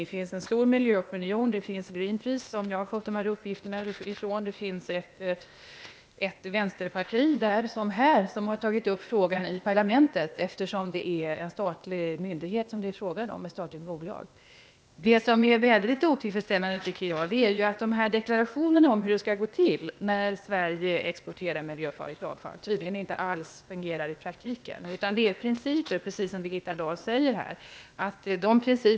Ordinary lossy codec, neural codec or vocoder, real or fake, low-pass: none; codec, 16 kHz, 0.8 kbps, ZipCodec; fake; none